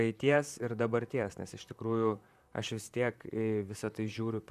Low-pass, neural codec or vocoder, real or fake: 14.4 kHz; vocoder, 44.1 kHz, 128 mel bands, Pupu-Vocoder; fake